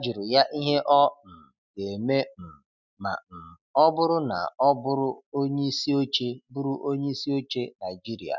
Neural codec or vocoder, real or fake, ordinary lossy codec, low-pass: none; real; none; 7.2 kHz